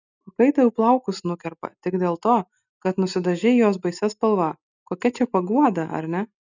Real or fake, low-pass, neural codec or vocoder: real; 7.2 kHz; none